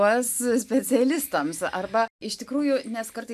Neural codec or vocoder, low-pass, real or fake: none; 14.4 kHz; real